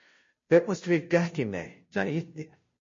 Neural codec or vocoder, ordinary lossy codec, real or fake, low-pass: codec, 16 kHz, 0.5 kbps, FunCodec, trained on Chinese and English, 25 frames a second; MP3, 32 kbps; fake; 7.2 kHz